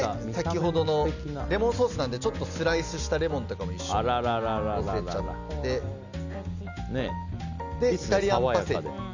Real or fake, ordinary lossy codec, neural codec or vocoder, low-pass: real; none; none; 7.2 kHz